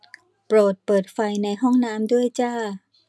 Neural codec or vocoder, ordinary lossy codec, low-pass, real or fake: none; none; none; real